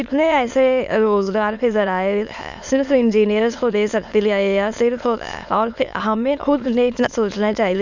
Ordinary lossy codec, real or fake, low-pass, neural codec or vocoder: none; fake; 7.2 kHz; autoencoder, 22.05 kHz, a latent of 192 numbers a frame, VITS, trained on many speakers